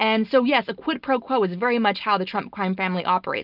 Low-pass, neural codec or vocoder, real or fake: 5.4 kHz; none; real